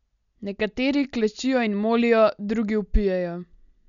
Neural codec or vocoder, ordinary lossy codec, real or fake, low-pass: none; none; real; 7.2 kHz